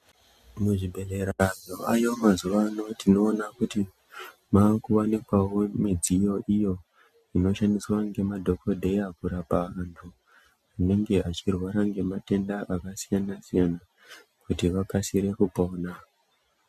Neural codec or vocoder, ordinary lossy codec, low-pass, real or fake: none; MP3, 96 kbps; 14.4 kHz; real